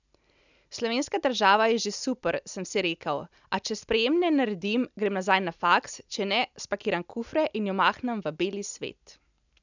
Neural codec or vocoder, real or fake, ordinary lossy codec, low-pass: none; real; none; 7.2 kHz